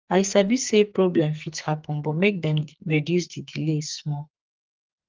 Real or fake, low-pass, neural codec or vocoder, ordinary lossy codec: fake; 7.2 kHz; codec, 44.1 kHz, 2.6 kbps, SNAC; Opus, 32 kbps